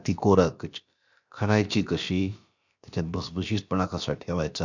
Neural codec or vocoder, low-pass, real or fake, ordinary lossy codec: codec, 16 kHz, about 1 kbps, DyCAST, with the encoder's durations; 7.2 kHz; fake; none